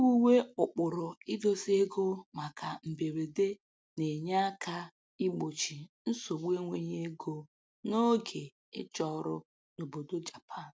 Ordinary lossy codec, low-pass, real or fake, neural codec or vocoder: none; none; real; none